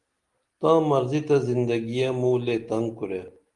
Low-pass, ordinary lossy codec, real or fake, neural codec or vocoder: 10.8 kHz; Opus, 24 kbps; real; none